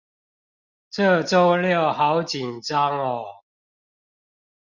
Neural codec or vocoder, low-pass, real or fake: none; 7.2 kHz; real